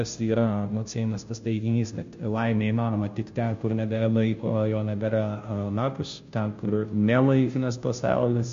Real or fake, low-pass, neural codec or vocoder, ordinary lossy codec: fake; 7.2 kHz; codec, 16 kHz, 0.5 kbps, FunCodec, trained on Chinese and English, 25 frames a second; MP3, 48 kbps